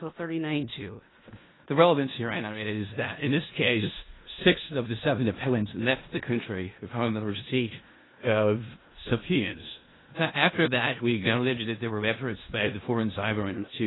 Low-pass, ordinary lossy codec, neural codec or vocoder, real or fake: 7.2 kHz; AAC, 16 kbps; codec, 16 kHz in and 24 kHz out, 0.4 kbps, LongCat-Audio-Codec, four codebook decoder; fake